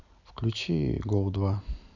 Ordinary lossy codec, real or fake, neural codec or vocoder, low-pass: none; real; none; 7.2 kHz